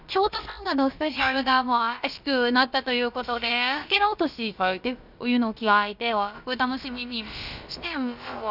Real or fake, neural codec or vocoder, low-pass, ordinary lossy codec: fake; codec, 16 kHz, about 1 kbps, DyCAST, with the encoder's durations; 5.4 kHz; none